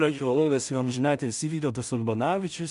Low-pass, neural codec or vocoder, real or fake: 10.8 kHz; codec, 16 kHz in and 24 kHz out, 0.4 kbps, LongCat-Audio-Codec, two codebook decoder; fake